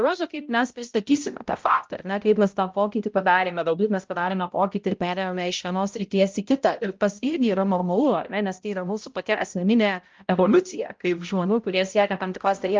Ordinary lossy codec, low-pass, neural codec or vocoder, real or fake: Opus, 24 kbps; 7.2 kHz; codec, 16 kHz, 0.5 kbps, X-Codec, HuBERT features, trained on balanced general audio; fake